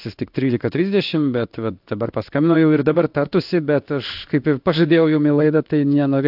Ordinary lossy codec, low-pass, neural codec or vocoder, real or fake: AAC, 48 kbps; 5.4 kHz; codec, 16 kHz in and 24 kHz out, 1 kbps, XY-Tokenizer; fake